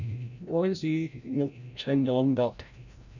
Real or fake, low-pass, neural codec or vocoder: fake; 7.2 kHz; codec, 16 kHz, 0.5 kbps, FreqCodec, larger model